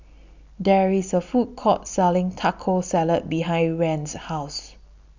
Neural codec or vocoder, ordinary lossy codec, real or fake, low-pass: none; none; real; 7.2 kHz